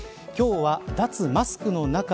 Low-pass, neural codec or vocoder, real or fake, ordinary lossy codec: none; none; real; none